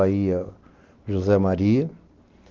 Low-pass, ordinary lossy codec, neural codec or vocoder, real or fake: 7.2 kHz; Opus, 16 kbps; none; real